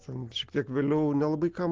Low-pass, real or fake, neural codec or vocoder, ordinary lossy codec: 7.2 kHz; real; none; Opus, 16 kbps